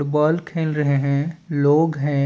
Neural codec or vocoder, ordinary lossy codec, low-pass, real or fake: none; none; none; real